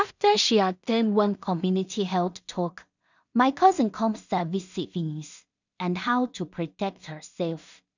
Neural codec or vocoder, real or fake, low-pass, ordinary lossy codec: codec, 16 kHz in and 24 kHz out, 0.4 kbps, LongCat-Audio-Codec, two codebook decoder; fake; 7.2 kHz; none